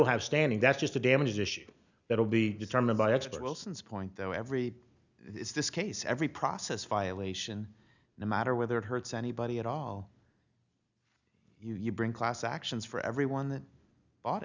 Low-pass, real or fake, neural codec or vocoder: 7.2 kHz; real; none